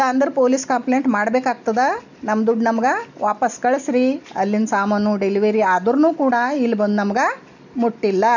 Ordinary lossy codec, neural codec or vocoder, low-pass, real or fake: none; none; 7.2 kHz; real